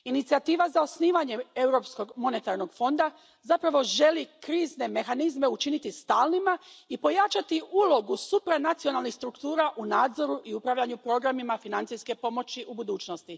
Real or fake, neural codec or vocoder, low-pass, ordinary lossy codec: real; none; none; none